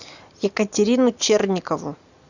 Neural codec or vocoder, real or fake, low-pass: none; real; 7.2 kHz